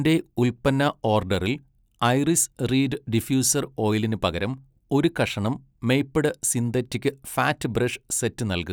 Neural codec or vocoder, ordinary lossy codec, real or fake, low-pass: none; none; real; none